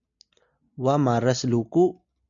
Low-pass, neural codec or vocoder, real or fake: 7.2 kHz; none; real